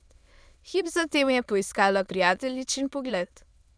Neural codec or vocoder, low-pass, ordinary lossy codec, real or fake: autoencoder, 22.05 kHz, a latent of 192 numbers a frame, VITS, trained on many speakers; none; none; fake